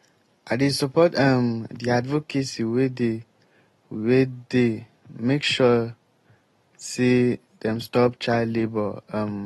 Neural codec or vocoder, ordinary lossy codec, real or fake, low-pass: none; AAC, 32 kbps; real; 19.8 kHz